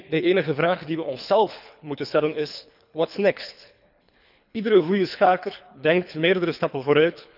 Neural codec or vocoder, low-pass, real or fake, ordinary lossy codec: codec, 24 kHz, 3 kbps, HILCodec; 5.4 kHz; fake; none